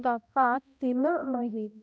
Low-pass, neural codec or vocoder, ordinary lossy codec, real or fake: none; codec, 16 kHz, 0.5 kbps, X-Codec, HuBERT features, trained on balanced general audio; none; fake